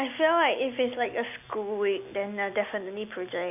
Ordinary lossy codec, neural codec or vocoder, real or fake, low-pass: none; none; real; 3.6 kHz